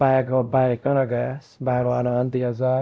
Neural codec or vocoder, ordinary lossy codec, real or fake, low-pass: codec, 16 kHz, 0.5 kbps, X-Codec, WavLM features, trained on Multilingual LibriSpeech; none; fake; none